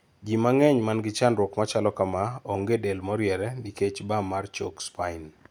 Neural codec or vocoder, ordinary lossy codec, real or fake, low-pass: none; none; real; none